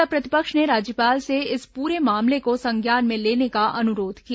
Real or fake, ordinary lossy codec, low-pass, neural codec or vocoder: real; none; none; none